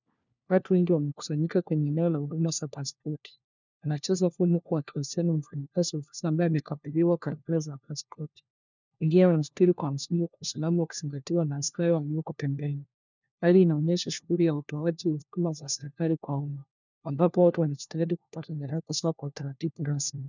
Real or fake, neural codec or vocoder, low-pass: fake; codec, 16 kHz, 1 kbps, FunCodec, trained on LibriTTS, 50 frames a second; 7.2 kHz